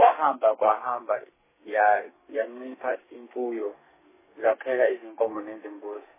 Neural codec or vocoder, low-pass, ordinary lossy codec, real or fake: codec, 32 kHz, 1.9 kbps, SNAC; 3.6 kHz; MP3, 16 kbps; fake